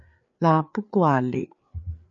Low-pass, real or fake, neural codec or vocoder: 7.2 kHz; fake; codec, 16 kHz, 4 kbps, FreqCodec, larger model